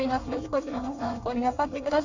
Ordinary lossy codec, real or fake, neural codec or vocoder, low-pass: none; fake; codec, 24 kHz, 1 kbps, SNAC; 7.2 kHz